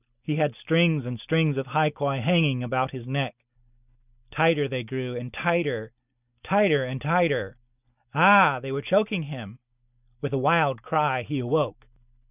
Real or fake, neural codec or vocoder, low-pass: real; none; 3.6 kHz